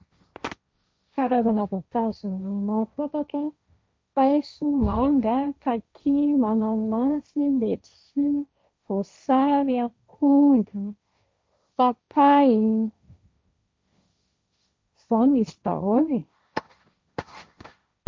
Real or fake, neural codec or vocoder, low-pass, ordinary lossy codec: fake; codec, 16 kHz, 1.1 kbps, Voila-Tokenizer; none; none